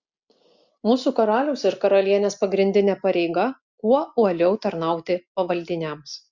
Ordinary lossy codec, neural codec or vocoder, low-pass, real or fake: Opus, 64 kbps; none; 7.2 kHz; real